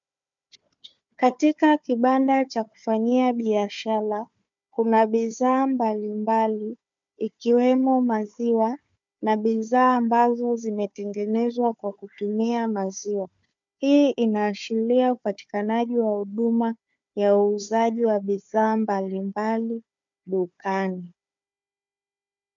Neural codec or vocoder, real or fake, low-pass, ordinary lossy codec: codec, 16 kHz, 4 kbps, FunCodec, trained on Chinese and English, 50 frames a second; fake; 7.2 kHz; MP3, 64 kbps